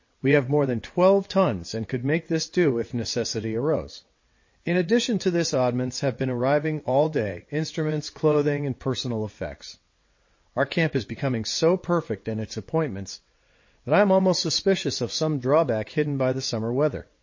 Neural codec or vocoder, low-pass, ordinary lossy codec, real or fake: vocoder, 22.05 kHz, 80 mel bands, WaveNeXt; 7.2 kHz; MP3, 32 kbps; fake